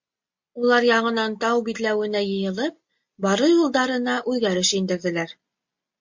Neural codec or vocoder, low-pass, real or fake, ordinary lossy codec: none; 7.2 kHz; real; MP3, 48 kbps